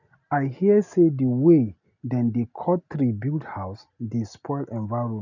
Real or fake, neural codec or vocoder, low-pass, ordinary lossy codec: real; none; 7.2 kHz; none